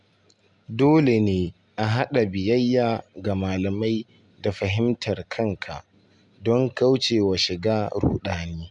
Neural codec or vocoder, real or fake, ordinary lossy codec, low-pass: none; real; none; 10.8 kHz